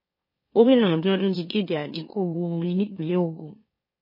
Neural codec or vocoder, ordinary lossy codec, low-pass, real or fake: autoencoder, 44.1 kHz, a latent of 192 numbers a frame, MeloTTS; MP3, 24 kbps; 5.4 kHz; fake